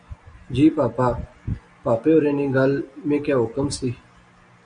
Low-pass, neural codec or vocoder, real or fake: 9.9 kHz; none; real